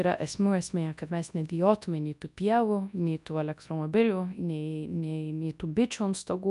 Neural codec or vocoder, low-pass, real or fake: codec, 24 kHz, 0.9 kbps, WavTokenizer, large speech release; 10.8 kHz; fake